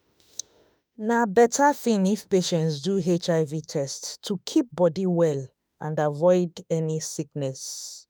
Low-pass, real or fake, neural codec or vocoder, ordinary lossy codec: none; fake; autoencoder, 48 kHz, 32 numbers a frame, DAC-VAE, trained on Japanese speech; none